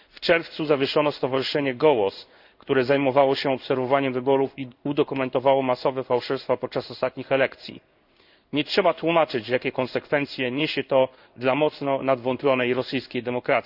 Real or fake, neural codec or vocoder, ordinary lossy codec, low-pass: fake; codec, 16 kHz in and 24 kHz out, 1 kbps, XY-Tokenizer; none; 5.4 kHz